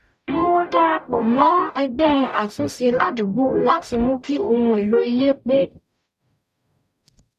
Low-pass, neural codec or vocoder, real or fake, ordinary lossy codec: 14.4 kHz; codec, 44.1 kHz, 0.9 kbps, DAC; fake; none